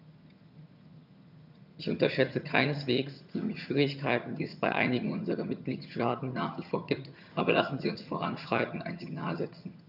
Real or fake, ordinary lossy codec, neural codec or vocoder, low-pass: fake; none; vocoder, 22.05 kHz, 80 mel bands, HiFi-GAN; 5.4 kHz